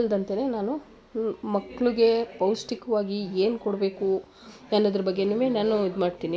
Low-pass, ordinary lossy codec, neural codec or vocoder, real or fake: none; none; none; real